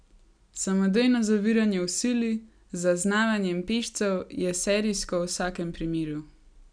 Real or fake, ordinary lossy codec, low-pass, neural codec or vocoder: real; none; 9.9 kHz; none